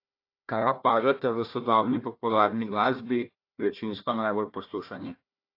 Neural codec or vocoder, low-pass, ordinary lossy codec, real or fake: codec, 16 kHz, 1 kbps, FunCodec, trained on Chinese and English, 50 frames a second; 5.4 kHz; AAC, 32 kbps; fake